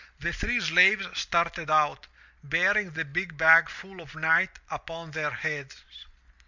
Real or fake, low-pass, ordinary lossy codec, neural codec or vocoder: real; 7.2 kHz; Opus, 64 kbps; none